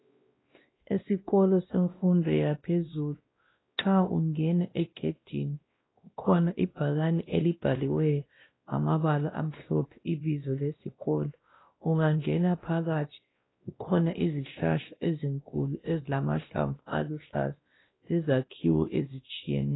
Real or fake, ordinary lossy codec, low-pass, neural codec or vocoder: fake; AAC, 16 kbps; 7.2 kHz; codec, 16 kHz, 1 kbps, X-Codec, WavLM features, trained on Multilingual LibriSpeech